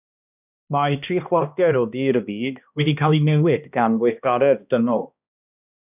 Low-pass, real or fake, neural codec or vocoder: 3.6 kHz; fake; codec, 16 kHz, 1 kbps, X-Codec, HuBERT features, trained on balanced general audio